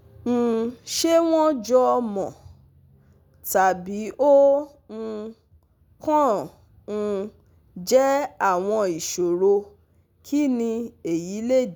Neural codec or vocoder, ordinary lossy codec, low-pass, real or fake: none; none; none; real